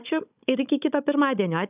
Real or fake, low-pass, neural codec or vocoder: fake; 3.6 kHz; codec, 16 kHz, 16 kbps, FunCodec, trained on Chinese and English, 50 frames a second